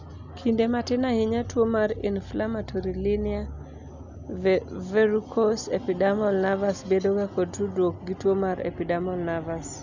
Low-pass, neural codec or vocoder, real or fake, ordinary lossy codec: 7.2 kHz; none; real; none